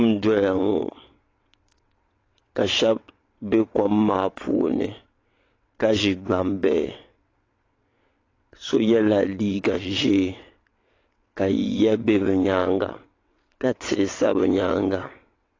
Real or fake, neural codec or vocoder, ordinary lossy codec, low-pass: fake; vocoder, 22.05 kHz, 80 mel bands, WaveNeXt; AAC, 32 kbps; 7.2 kHz